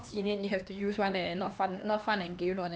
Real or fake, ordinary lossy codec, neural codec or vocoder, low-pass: fake; none; codec, 16 kHz, 2 kbps, X-Codec, HuBERT features, trained on LibriSpeech; none